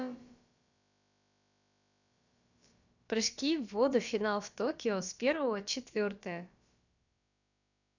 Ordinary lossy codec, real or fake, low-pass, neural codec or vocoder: none; fake; 7.2 kHz; codec, 16 kHz, about 1 kbps, DyCAST, with the encoder's durations